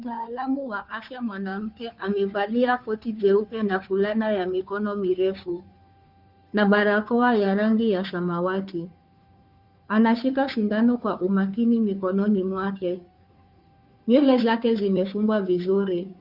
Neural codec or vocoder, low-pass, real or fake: codec, 16 kHz, 2 kbps, FunCodec, trained on Chinese and English, 25 frames a second; 5.4 kHz; fake